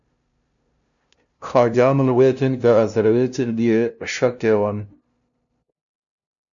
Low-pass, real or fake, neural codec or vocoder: 7.2 kHz; fake; codec, 16 kHz, 0.5 kbps, FunCodec, trained on LibriTTS, 25 frames a second